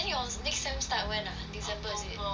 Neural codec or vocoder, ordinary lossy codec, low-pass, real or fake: none; none; none; real